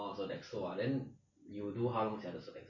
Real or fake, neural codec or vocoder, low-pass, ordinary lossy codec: real; none; 7.2 kHz; MP3, 32 kbps